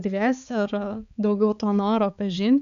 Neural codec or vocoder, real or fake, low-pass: codec, 16 kHz, 4 kbps, X-Codec, HuBERT features, trained on balanced general audio; fake; 7.2 kHz